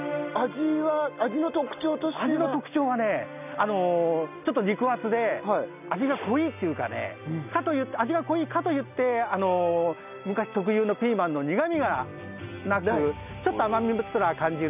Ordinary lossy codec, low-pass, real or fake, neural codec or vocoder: none; 3.6 kHz; real; none